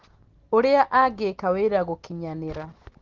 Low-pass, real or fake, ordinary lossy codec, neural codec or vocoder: 7.2 kHz; real; Opus, 16 kbps; none